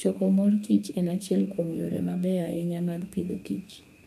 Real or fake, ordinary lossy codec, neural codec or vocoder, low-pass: fake; none; codec, 32 kHz, 1.9 kbps, SNAC; 14.4 kHz